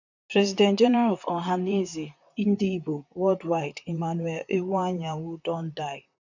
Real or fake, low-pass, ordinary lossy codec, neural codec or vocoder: fake; 7.2 kHz; none; vocoder, 44.1 kHz, 128 mel bands, Pupu-Vocoder